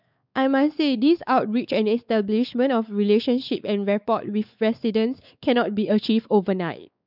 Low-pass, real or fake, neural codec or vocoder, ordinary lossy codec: 5.4 kHz; fake; codec, 16 kHz, 4 kbps, X-Codec, WavLM features, trained on Multilingual LibriSpeech; none